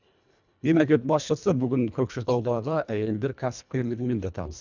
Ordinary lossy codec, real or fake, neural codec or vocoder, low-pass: none; fake; codec, 24 kHz, 1.5 kbps, HILCodec; 7.2 kHz